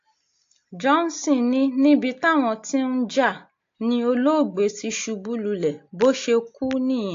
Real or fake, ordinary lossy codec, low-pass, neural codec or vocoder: real; AAC, 48 kbps; 7.2 kHz; none